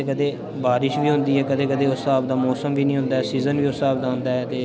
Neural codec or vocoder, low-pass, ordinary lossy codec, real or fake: none; none; none; real